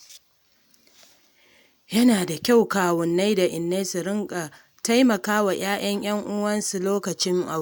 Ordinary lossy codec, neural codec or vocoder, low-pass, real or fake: none; none; none; real